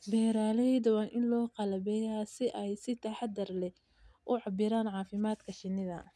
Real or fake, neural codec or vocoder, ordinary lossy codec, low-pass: real; none; none; none